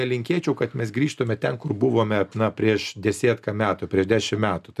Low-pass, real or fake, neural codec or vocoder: 14.4 kHz; real; none